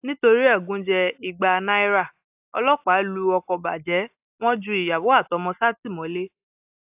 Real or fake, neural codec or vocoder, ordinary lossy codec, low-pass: real; none; AAC, 32 kbps; 3.6 kHz